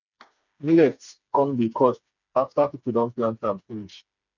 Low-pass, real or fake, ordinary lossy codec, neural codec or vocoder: 7.2 kHz; fake; none; codec, 16 kHz, 4 kbps, FreqCodec, smaller model